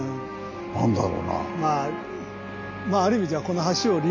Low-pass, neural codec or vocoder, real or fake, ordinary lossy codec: 7.2 kHz; none; real; AAC, 48 kbps